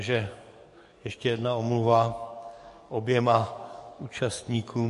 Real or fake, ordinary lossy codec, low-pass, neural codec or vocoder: fake; MP3, 48 kbps; 14.4 kHz; codec, 44.1 kHz, 7.8 kbps, DAC